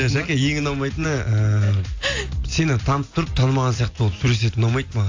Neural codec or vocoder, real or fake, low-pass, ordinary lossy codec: none; real; 7.2 kHz; AAC, 48 kbps